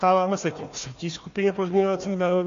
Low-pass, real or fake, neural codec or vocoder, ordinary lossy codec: 7.2 kHz; fake; codec, 16 kHz, 1 kbps, FunCodec, trained on Chinese and English, 50 frames a second; AAC, 48 kbps